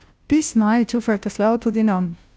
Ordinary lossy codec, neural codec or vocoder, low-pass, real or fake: none; codec, 16 kHz, 0.5 kbps, FunCodec, trained on Chinese and English, 25 frames a second; none; fake